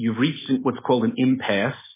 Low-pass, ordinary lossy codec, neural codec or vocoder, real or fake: 3.6 kHz; MP3, 16 kbps; none; real